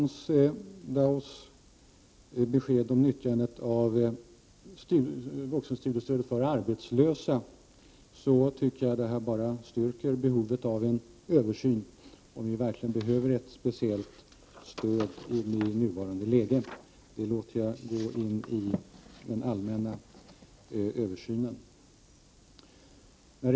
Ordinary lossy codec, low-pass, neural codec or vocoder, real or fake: none; none; none; real